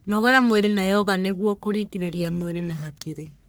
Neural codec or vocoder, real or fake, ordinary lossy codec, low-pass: codec, 44.1 kHz, 1.7 kbps, Pupu-Codec; fake; none; none